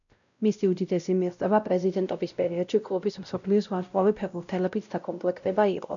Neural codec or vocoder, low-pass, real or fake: codec, 16 kHz, 0.5 kbps, X-Codec, WavLM features, trained on Multilingual LibriSpeech; 7.2 kHz; fake